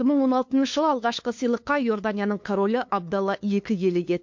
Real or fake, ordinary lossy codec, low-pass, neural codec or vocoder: fake; MP3, 48 kbps; 7.2 kHz; codec, 16 kHz, 2 kbps, FunCodec, trained on LibriTTS, 25 frames a second